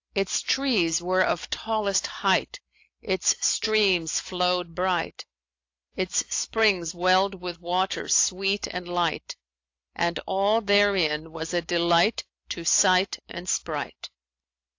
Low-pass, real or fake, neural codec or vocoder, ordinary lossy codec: 7.2 kHz; real; none; AAC, 48 kbps